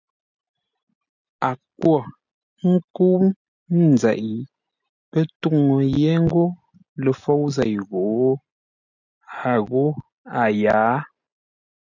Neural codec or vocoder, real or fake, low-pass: none; real; 7.2 kHz